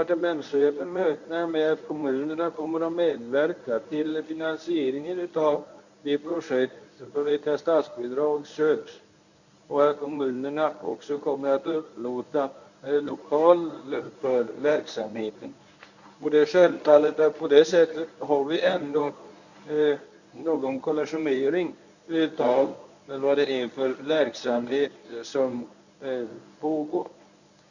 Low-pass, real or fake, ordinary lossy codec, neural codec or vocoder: 7.2 kHz; fake; none; codec, 24 kHz, 0.9 kbps, WavTokenizer, medium speech release version 1